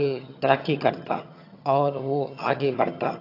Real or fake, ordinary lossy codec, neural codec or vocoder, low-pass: fake; AAC, 32 kbps; vocoder, 22.05 kHz, 80 mel bands, HiFi-GAN; 5.4 kHz